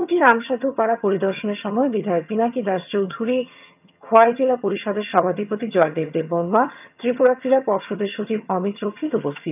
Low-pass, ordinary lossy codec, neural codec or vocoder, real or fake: 3.6 kHz; none; vocoder, 22.05 kHz, 80 mel bands, HiFi-GAN; fake